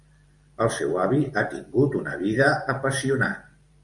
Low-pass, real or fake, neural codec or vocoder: 10.8 kHz; fake; vocoder, 44.1 kHz, 128 mel bands every 512 samples, BigVGAN v2